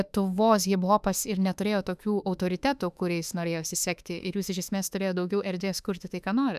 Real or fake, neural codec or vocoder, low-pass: fake; autoencoder, 48 kHz, 32 numbers a frame, DAC-VAE, trained on Japanese speech; 14.4 kHz